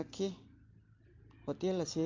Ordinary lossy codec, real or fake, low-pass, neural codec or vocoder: Opus, 32 kbps; real; 7.2 kHz; none